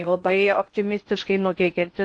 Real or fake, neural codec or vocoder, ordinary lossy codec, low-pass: fake; codec, 16 kHz in and 24 kHz out, 0.6 kbps, FocalCodec, streaming, 2048 codes; AAC, 48 kbps; 9.9 kHz